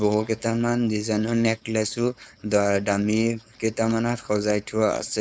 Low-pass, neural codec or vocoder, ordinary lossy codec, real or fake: none; codec, 16 kHz, 4.8 kbps, FACodec; none; fake